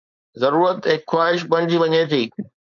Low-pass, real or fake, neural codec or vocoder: 7.2 kHz; fake; codec, 16 kHz, 4.8 kbps, FACodec